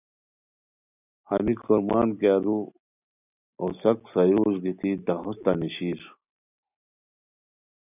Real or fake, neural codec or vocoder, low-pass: real; none; 3.6 kHz